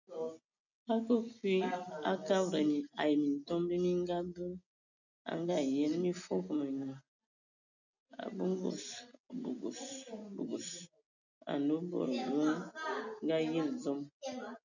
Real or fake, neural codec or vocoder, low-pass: real; none; 7.2 kHz